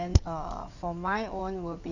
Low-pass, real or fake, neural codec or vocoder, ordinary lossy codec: 7.2 kHz; fake; codec, 16 kHz in and 24 kHz out, 2.2 kbps, FireRedTTS-2 codec; none